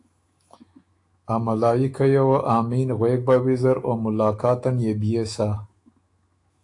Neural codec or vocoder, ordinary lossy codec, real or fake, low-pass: autoencoder, 48 kHz, 128 numbers a frame, DAC-VAE, trained on Japanese speech; AAC, 48 kbps; fake; 10.8 kHz